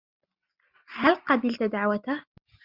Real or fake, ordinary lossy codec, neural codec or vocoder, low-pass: real; Opus, 64 kbps; none; 5.4 kHz